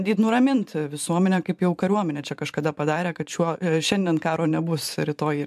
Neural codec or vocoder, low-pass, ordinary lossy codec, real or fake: vocoder, 44.1 kHz, 128 mel bands every 512 samples, BigVGAN v2; 14.4 kHz; MP3, 96 kbps; fake